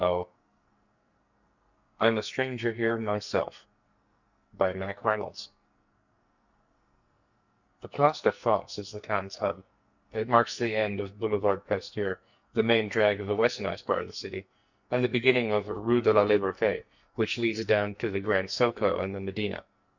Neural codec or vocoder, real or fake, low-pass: codec, 44.1 kHz, 2.6 kbps, SNAC; fake; 7.2 kHz